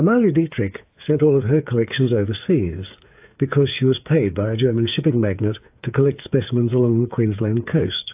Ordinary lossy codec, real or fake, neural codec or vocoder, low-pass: AAC, 32 kbps; fake; codec, 16 kHz, 8 kbps, FreqCodec, smaller model; 3.6 kHz